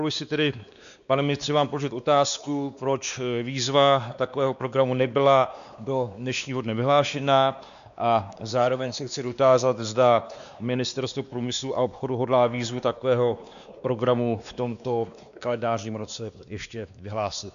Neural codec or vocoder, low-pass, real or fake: codec, 16 kHz, 2 kbps, X-Codec, WavLM features, trained on Multilingual LibriSpeech; 7.2 kHz; fake